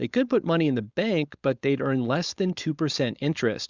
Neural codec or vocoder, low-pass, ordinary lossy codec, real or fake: codec, 16 kHz, 4.8 kbps, FACodec; 7.2 kHz; Opus, 64 kbps; fake